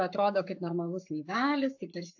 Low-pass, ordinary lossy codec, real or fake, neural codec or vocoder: 7.2 kHz; AAC, 48 kbps; fake; codec, 44.1 kHz, 7.8 kbps, Pupu-Codec